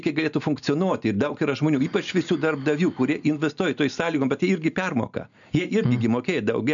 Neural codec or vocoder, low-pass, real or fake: none; 7.2 kHz; real